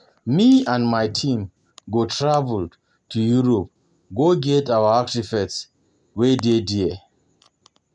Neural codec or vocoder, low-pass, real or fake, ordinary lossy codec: none; 10.8 kHz; real; none